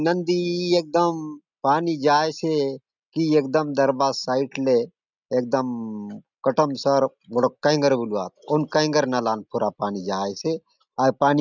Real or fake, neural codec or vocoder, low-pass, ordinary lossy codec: real; none; 7.2 kHz; none